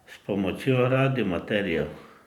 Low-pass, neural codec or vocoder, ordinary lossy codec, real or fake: 19.8 kHz; vocoder, 44.1 kHz, 128 mel bands every 512 samples, BigVGAN v2; none; fake